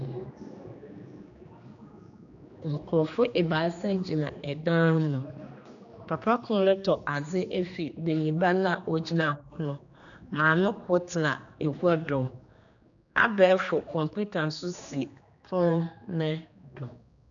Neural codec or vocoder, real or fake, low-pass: codec, 16 kHz, 2 kbps, X-Codec, HuBERT features, trained on general audio; fake; 7.2 kHz